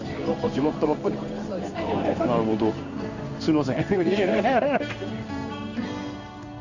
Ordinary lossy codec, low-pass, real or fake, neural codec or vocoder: none; 7.2 kHz; fake; codec, 16 kHz in and 24 kHz out, 1 kbps, XY-Tokenizer